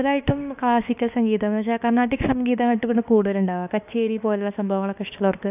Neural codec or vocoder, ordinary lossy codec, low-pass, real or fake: autoencoder, 48 kHz, 32 numbers a frame, DAC-VAE, trained on Japanese speech; none; 3.6 kHz; fake